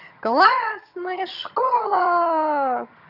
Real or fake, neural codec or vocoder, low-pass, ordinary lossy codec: fake; vocoder, 22.05 kHz, 80 mel bands, HiFi-GAN; 5.4 kHz; none